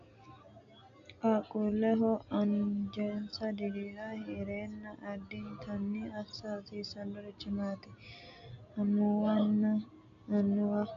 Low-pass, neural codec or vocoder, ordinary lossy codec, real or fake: 7.2 kHz; none; MP3, 64 kbps; real